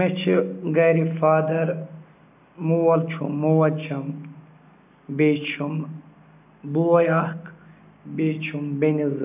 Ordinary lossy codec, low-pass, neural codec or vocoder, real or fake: AAC, 32 kbps; 3.6 kHz; none; real